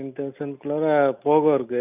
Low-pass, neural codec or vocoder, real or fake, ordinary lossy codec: 3.6 kHz; none; real; none